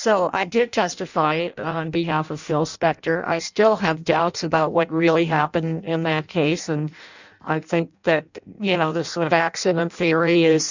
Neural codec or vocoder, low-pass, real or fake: codec, 16 kHz in and 24 kHz out, 0.6 kbps, FireRedTTS-2 codec; 7.2 kHz; fake